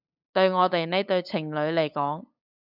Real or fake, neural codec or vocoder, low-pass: fake; codec, 16 kHz, 8 kbps, FunCodec, trained on LibriTTS, 25 frames a second; 5.4 kHz